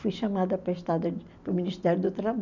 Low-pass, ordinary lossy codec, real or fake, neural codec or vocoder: 7.2 kHz; none; real; none